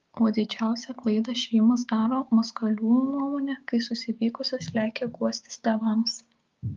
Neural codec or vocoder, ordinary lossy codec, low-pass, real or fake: codec, 16 kHz, 6 kbps, DAC; Opus, 32 kbps; 7.2 kHz; fake